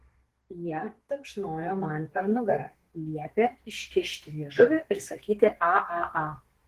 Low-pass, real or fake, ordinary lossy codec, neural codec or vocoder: 14.4 kHz; fake; Opus, 16 kbps; codec, 32 kHz, 1.9 kbps, SNAC